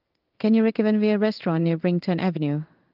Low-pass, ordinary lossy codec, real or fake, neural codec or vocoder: 5.4 kHz; Opus, 32 kbps; fake; codec, 16 kHz in and 24 kHz out, 1 kbps, XY-Tokenizer